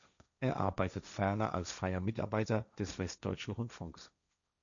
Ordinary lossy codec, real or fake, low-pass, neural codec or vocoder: MP3, 96 kbps; fake; 7.2 kHz; codec, 16 kHz, 1.1 kbps, Voila-Tokenizer